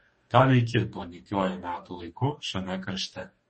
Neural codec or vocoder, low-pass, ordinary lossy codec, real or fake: codec, 44.1 kHz, 2.6 kbps, DAC; 10.8 kHz; MP3, 32 kbps; fake